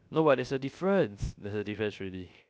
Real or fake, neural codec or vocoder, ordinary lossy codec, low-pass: fake; codec, 16 kHz, 0.3 kbps, FocalCodec; none; none